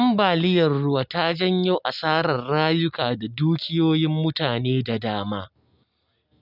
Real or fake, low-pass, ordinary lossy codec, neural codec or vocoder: real; 5.4 kHz; none; none